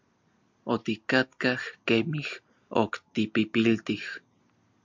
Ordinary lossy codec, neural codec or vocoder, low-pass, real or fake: MP3, 64 kbps; none; 7.2 kHz; real